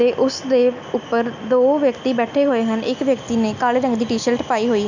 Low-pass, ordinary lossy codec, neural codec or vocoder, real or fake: 7.2 kHz; none; none; real